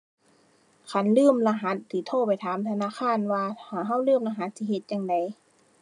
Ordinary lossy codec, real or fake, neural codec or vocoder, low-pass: none; real; none; 10.8 kHz